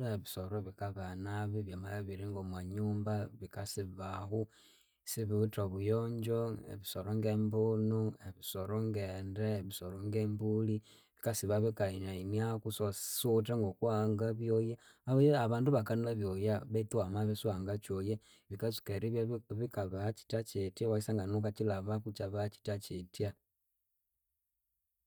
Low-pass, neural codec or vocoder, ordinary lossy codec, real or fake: none; none; none; real